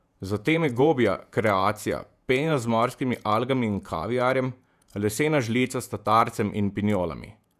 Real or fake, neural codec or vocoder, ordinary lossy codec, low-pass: fake; vocoder, 48 kHz, 128 mel bands, Vocos; none; 14.4 kHz